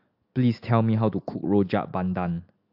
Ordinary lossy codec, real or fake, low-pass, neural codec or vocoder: AAC, 48 kbps; real; 5.4 kHz; none